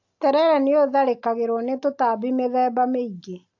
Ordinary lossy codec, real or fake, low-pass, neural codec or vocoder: AAC, 48 kbps; real; 7.2 kHz; none